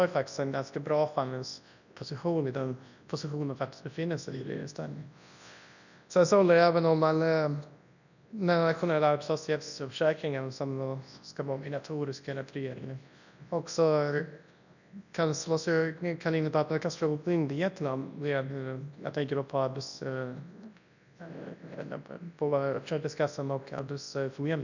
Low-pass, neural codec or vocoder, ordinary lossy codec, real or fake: 7.2 kHz; codec, 24 kHz, 0.9 kbps, WavTokenizer, large speech release; none; fake